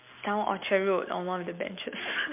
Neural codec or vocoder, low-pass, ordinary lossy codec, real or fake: none; 3.6 kHz; MP3, 32 kbps; real